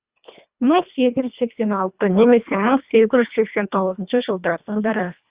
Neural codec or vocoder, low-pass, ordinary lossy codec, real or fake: codec, 24 kHz, 1.5 kbps, HILCodec; 3.6 kHz; Opus, 64 kbps; fake